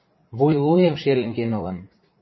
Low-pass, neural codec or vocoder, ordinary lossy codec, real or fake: 7.2 kHz; vocoder, 44.1 kHz, 80 mel bands, Vocos; MP3, 24 kbps; fake